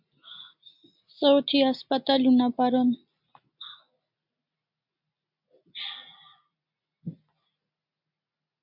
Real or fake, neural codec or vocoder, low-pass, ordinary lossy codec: real; none; 5.4 kHz; MP3, 48 kbps